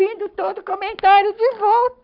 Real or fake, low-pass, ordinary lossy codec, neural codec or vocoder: fake; 5.4 kHz; none; codec, 44.1 kHz, 7.8 kbps, Pupu-Codec